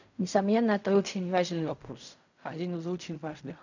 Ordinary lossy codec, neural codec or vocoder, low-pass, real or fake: none; codec, 16 kHz in and 24 kHz out, 0.4 kbps, LongCat-Audio-Codec, fine tuned four codebook decoder; 7.2 kHz; fake